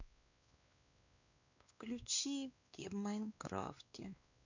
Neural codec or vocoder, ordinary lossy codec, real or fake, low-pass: codec, 16 kHz, 2 kbps, X-Codec, WavLM features, trained on Multilingual LibriSpeech; none; fake; 7.2 kHz